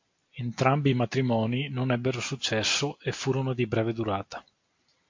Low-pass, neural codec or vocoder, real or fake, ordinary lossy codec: 7.2 kHz; none; real; MP3, 48 kbps